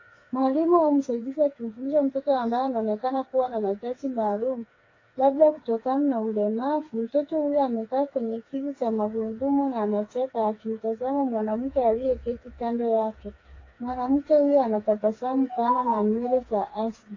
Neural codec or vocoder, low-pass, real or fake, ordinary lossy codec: codec, 16 kHz, 4 kbps, FreqCodec, smaller model; 7.2 kHz; fake; AAC, 32 kbps